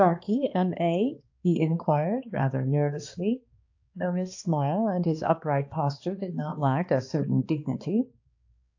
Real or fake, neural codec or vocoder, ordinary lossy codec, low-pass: fake; codec, 16 kHz, 2 kbps, X-Codec, HuBERT features, trained on balanced general audio; AAC, 48 kbps; 7.2 kHz